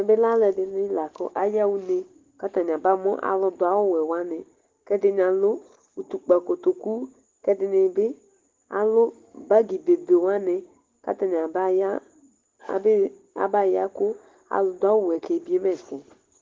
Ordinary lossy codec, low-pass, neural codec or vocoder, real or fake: Opus, 16 kbps; 7.2 kHz; none; real